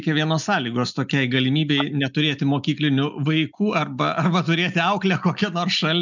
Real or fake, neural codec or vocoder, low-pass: real; none; 7.2 kHz